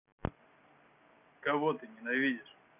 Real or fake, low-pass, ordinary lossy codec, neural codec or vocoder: real; 3.6 kHz; none; none